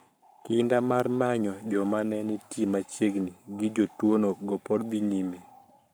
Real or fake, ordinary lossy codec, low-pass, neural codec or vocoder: fake; none; none; codec, 44.1 kHz, 7.8 kbps, Pupu-Codec